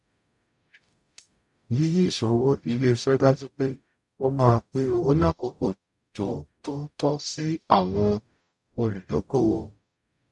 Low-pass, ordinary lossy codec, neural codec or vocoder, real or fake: 10.8 kHz; none; codec, 44.1 kHz, 0.9 kbps, DAC; fake